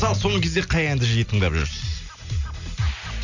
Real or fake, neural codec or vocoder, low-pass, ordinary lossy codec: real; none; 7.2 kHz; none